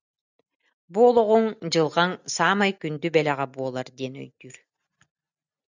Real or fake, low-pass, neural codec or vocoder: real; 7.2 kHz; none